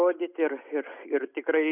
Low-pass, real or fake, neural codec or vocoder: 3.6 kHz; real; none